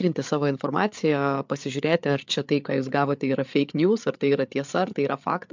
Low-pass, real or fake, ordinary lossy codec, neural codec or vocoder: 7.2 kHz; fake; MP3, 64 kbps; codec, 16 kHz, 8 kbps, FreqCodec, larger model